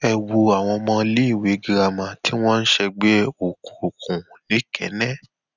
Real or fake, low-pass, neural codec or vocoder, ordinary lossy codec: real; 7.2 kHz; none; none